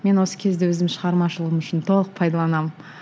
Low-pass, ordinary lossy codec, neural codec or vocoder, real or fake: none; none; none; real